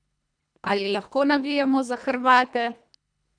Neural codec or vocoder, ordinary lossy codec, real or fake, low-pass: codec, 24 kHz, 1.5 kbps, HILCodec; none; fake; 9.9 kHz